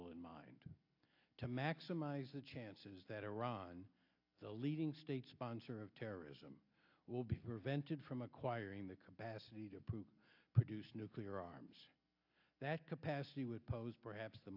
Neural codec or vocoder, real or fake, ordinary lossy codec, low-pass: none; real; AAC, 48 kbps; 5.4 kHz